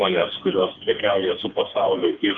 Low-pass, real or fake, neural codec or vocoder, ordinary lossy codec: 7.2 kHz; fake; codec, 16 kHz, 2 kbps, FreqCodec, smaller model; Opus, 24 kbps